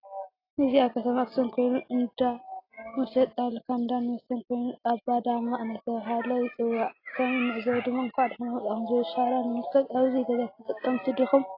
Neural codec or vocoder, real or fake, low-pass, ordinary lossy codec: none; real; 5.4 kHz; AAC, 24 kbps